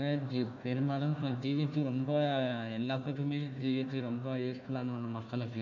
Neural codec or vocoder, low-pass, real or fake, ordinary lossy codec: codec, 16 kHz, 1 kbps, FunCodec, trained on Chinese and English, 50 frames a second; 7.2 kHz; fake; none